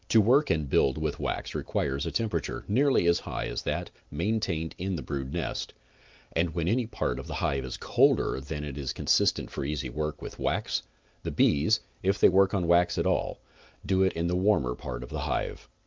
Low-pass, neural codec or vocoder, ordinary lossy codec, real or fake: 7.2 kHz; none; Opus, 24 kbps; real